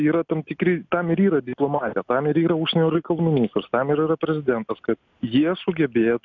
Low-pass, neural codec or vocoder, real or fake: 7.2 kHz; none; real